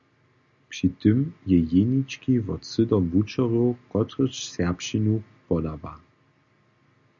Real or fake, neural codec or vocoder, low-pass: real; none; 7.2 kHz